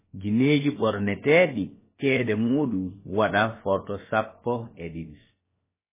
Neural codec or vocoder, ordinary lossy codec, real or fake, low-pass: codec, 16 kHz, about 1 kbps, DyCAST, with the encoder's durations; MP3, 16 kbps; fake; 3.6 kHz